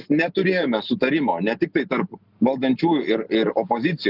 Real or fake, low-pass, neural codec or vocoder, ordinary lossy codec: real; 5.4 kHz; none; Opus, 32 kbps